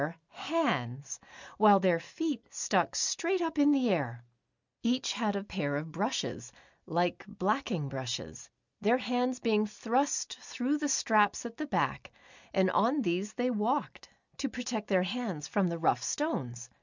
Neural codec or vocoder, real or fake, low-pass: none; real; 7.2 kHz